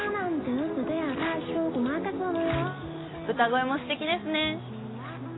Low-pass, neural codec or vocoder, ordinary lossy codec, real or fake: 7.2 kHz; none; AAC, 16 kbps; real